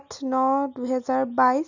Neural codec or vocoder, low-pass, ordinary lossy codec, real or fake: none; 7.2 kHz; none; real